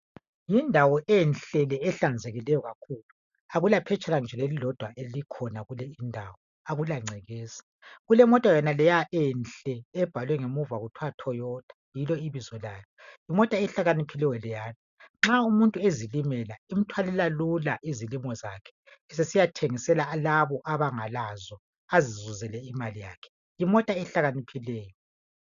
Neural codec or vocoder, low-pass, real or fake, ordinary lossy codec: none; 7.2 kHz; real; MP3, 96 kbps